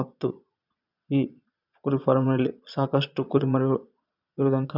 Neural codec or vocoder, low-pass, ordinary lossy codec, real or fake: vocoder, 22.05 kHz, 80 mel bands, Vocos; 5.4 kHz; none; fake